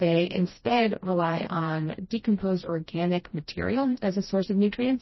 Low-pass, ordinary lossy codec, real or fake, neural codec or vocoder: 7.2 kHz; MP3, 24 kbps; fake; codec, 16 kHz, 1 kbps, FreqCodec, smaller model